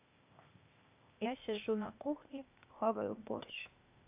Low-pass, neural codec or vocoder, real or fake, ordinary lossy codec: 3.6 kHz; codec, 16 kHz, 0.8 kbps, ZipCodec; fake; AAC, 32 kbps